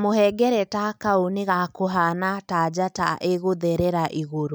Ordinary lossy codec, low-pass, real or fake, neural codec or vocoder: none; none; real; none